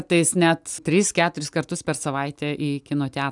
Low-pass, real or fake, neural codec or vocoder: 10.8 kHz; real; none